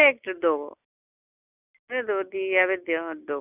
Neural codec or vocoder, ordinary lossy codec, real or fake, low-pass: none; none; real; 3.6 kHz